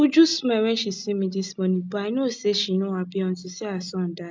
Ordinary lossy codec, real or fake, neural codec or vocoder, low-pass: none; real; none; none